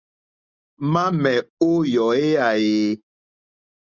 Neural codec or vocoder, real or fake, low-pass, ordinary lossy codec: none; real; 7.2 kHz; Opus, 64 kbps